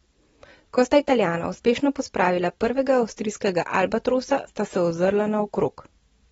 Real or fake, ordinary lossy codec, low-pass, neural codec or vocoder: real; AAC, 24 kbps; 19.8 kHz; none